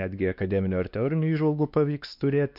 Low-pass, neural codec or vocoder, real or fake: 5.4 kHz; codec, 16 kHz, 2 kbps, X-Codec, WavLM features, trained on Multilingual LibriSpeech; fake